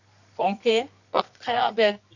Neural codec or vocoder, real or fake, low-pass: codec, 24 kHz, 0.9 kbps, WavTokenizer, medium music audio release; fake; 7.2 kHz